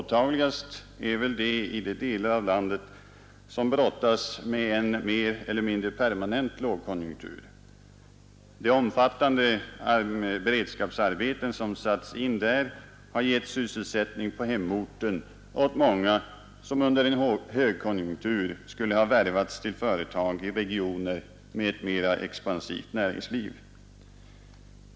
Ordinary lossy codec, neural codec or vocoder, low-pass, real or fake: none; none; none; real